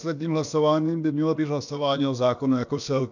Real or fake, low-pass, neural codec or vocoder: fake; 7.2 kHz; codec, 16 kHz, about 1 kbps, DyCAST, with the encoder's durations